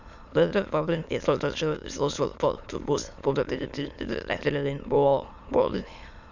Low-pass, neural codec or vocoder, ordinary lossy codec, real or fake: 7.2 kHz; autoencoder, 22.05 kHz, a latent of 192 numbers a frame, VITS, trained on many speakers; none; fake